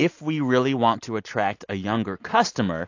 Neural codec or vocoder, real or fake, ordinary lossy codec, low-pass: none; real; AAC, 48 kbps; 7.2 kHz